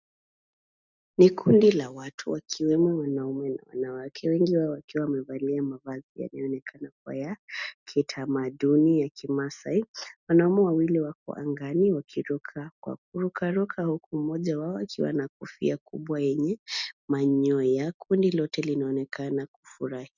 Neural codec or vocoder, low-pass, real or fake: none; 7.2 kHz; real